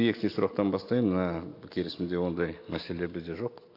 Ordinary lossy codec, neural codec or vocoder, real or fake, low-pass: AAC, 32 kbps; none; real; 5.4 kHz